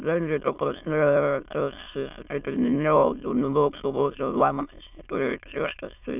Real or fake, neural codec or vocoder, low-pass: fake; autoencoder, 22.05 kHz, a latent of 192 numbers a frame, VITS, trained on many speakers; 3.6 kHz